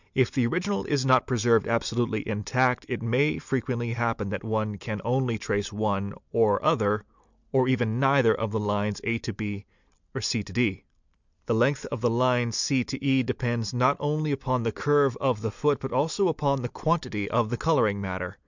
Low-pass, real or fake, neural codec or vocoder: 7.2 kHz; real; none